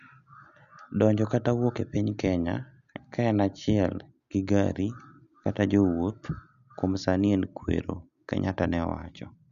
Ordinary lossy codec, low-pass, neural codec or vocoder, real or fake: none; 7.2 kHz; none; real